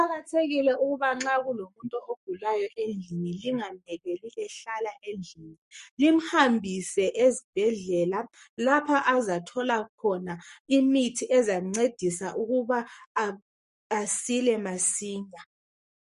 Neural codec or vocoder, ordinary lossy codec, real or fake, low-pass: codec, 44.1 kHz, 7.8 kbps, Pupu-Codec; MP3, 48 kbps; fake; 14.4 kHz